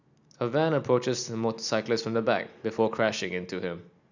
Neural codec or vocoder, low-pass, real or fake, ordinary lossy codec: none; 7.2 kHz; real; none